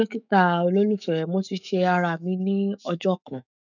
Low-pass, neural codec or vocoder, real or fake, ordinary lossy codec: 7.2 kHz; autoencoder, 48 kHz, 128 numbers a frame, DAC-VAE, trained on Japanese speech; fake; none